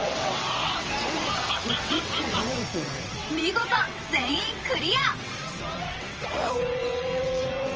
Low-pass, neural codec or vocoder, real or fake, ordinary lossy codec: 7.2 kHz; vocoder, 22.05 kHz, 80 mel bands, WaveNeXt; fake; Opus, 24 kbps